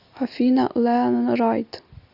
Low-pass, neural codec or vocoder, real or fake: 5.4 kHz; none; real